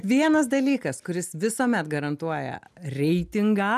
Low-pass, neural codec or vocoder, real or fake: 14.4 kHz; none; real